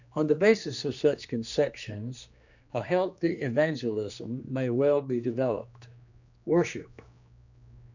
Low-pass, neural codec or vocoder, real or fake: 7.2 kHz; codec, 16 kHz, 2 kbps, X-Codec, HuBERT features, trained on general audio; fake